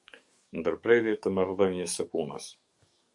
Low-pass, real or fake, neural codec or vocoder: 10.8 kHz; fake; codec, 44.1 kHz, 7.8 kbps, DAC